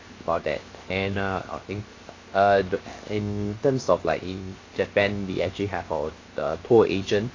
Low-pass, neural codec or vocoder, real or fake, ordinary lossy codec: 7.2 kHz; codec, 16 kHz, 0.7 kbps, FocalCodec; fake; AAC, 32 kbps